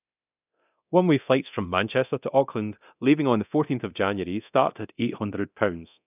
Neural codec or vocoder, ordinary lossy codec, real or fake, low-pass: codec, 24 kHz, 0.9 kbps, DualCodec; none; fake; 3.6 kHz